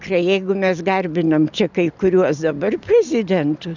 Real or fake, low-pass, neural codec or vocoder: real; 7.2 kHz; none